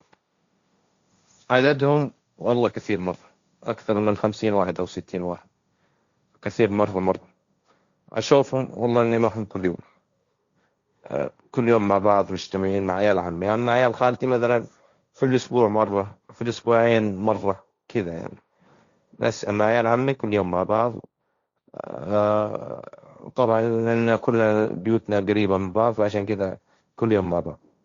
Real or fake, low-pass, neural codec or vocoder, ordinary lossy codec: fake; 7.2 kHz; codec, 16 kHz, 1.1 kbps, Voila-Tokenizer; Opus, 64 kbps